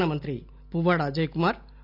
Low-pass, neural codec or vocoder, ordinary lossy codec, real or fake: 5.4 kHz; none; none; real